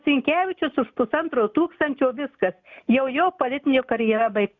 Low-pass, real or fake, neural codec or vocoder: 7.2 kHz; real; none